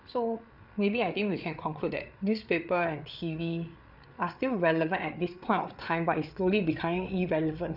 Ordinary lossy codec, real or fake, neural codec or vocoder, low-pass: none; fake; codec, 16 kHz, 8 kbps, FreqCodec, larger model; 5.4 kHz